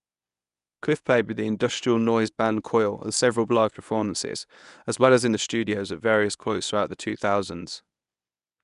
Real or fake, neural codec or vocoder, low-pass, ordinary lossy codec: fake; codec, 24 kHz, 0.9 kbps, WavTokenizer, medium speech release version 1; 10.8 kHz; none